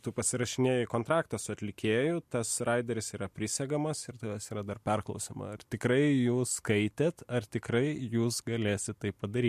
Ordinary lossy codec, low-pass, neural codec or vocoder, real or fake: MP3, 64 kbps; 14.4 kHz; none; real